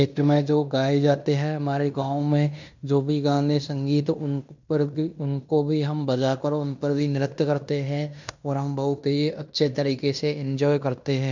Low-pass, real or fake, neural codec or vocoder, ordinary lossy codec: 7.2 kHz; fake; codec, 16 kHz in and 24 kHz out, 0.9 kbps, LongCat-Audio-Codec, fine tuned four codebook decoder; none